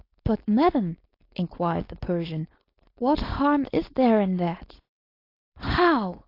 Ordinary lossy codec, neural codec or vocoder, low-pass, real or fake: AAC, 32 kbps; codec, 16 kHz, 4.8 kbps, FACodec; 5.4 kHz; fake